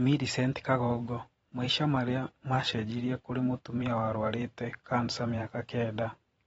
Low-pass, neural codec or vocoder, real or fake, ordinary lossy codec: 19.8 kHz; vocoder, 44.1 kHz, 128 mel bands every 512 samples, BigVGAN v2; fake; AAC, 24 kbps